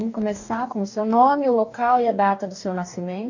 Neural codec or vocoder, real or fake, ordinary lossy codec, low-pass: codec, 44.1 kHz, 2.6 kbps, DAC; fake; none; 7.2 kHz